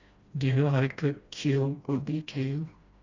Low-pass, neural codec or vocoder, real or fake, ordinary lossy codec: 7.2 kHz; codec, 16 kHz, 1 kbps, FreqCodec, smaller model; fake; Opus, 64 kbps